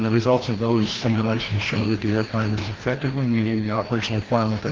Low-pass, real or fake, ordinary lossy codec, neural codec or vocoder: 7.2 kHz; fake; Opus, 16 kbps; codec, 16 kHz, 1 kbps, FreqCodec, larger model